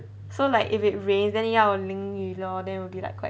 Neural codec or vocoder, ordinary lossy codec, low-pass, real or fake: none; none; none; real